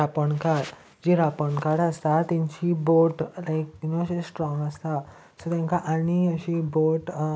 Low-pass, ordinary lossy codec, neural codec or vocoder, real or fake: none; none; none; real